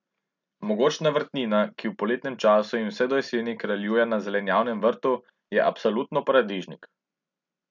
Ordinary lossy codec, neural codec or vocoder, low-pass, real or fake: none; none; 7.2 kHz; real